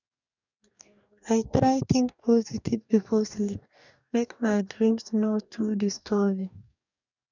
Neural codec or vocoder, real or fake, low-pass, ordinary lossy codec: codec, 44.1 kHz, 2.6 kbps, DAC; fake; 7.2 kHz; none